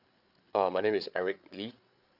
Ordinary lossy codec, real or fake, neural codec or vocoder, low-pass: none; fake; codec, 16 kHz, 8 kbps, FreqCodec, larger model; 5.4 kHz